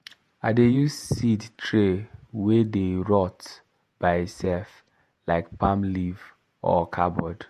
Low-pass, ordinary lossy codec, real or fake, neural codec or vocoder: 14.4 kHz; MP3, 64 kbps; fake; vocoder, 48 kHz, 128 mel bands, Vocos